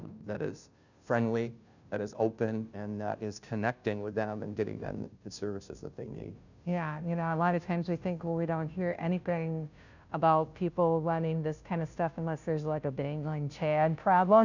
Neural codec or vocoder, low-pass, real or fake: codec, 16 kHz, 0.5 kbps, FunCodec, trained on Chinese and English, 25 frames a second; 7.2 kHz; fake